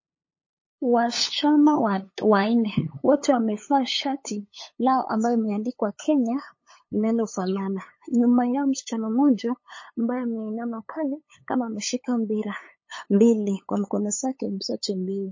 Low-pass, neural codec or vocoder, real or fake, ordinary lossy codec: 7.2 kHz; codec, 16 kHz, 8 kbps, FunCodec, trained on LibriTTS, 25 frames a second; fake; MP3, 32 kbps